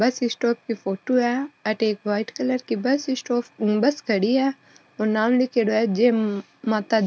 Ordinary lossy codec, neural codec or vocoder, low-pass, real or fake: none; none; none; real